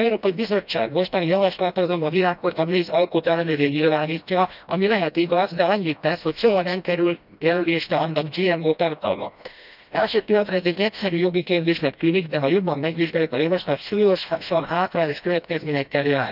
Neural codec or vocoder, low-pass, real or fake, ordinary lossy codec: codec, 16 kHz, 1 kbps, FreqCodec, smaller model; 5.4 kHz; fake; none